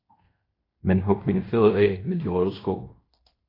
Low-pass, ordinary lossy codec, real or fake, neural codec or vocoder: 5.4 kHz; AAC, 24 kbps; fake; codec, 16 kHz in and 24 kHz out, 0.9 kbps, LongCat-Audio-Codec, fine tuned four codebook decoder